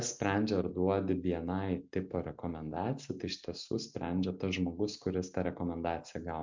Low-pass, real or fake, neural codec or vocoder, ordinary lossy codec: 7.2 kHz; real; none; MP3, 64 kbps